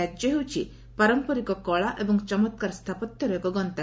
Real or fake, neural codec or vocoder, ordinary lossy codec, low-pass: real; none; none; none